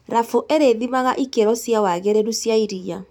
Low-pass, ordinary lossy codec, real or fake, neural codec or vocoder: 19.8 kHz; none; fake; vocoder, 44.1 kHz, 128 mel bands every 256 samples, BigVGAN v2